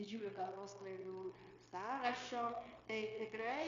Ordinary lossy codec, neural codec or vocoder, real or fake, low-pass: AAC, 64 kbps; codec, 16 kHz, 0.9 kbps, LongCat-Audio-Codec; fake; 7.2 kHz